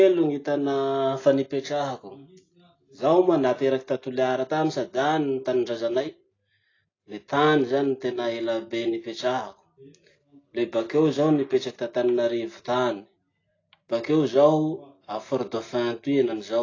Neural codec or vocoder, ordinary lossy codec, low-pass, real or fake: none; AAC, 32 kbps; 7.2 kHz; real